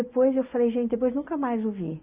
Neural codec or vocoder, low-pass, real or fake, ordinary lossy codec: none; 3.6 kHz; real; Opus, 64 kbps